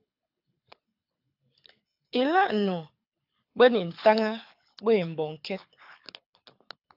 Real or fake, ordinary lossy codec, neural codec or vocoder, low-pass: fake; AAC, 48 kbps; codec, 24 kHz, 6 kbps, HILCodec; 5.4 kHz